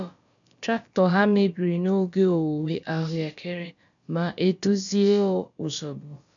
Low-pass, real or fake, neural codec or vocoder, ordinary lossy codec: 7.2 kHz; fake; codec, 16 kHz, about 1 kbps, DyCAST, with the encoder's durations; none